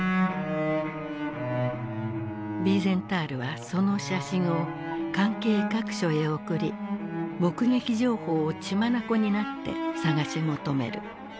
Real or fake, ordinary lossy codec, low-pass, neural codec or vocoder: real; none; none; none